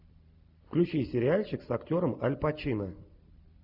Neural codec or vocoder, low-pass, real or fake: none; 5.4 kHz; real